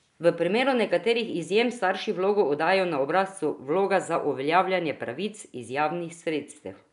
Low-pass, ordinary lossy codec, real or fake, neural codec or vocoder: 10.8 kHz; none; real; none